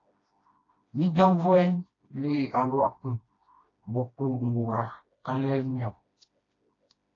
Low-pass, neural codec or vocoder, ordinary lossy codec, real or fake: 7.2 kHz; codec, 16 kHz, 1 kbps, FreqCodec, smaller model; AAC, 32 kbps; fake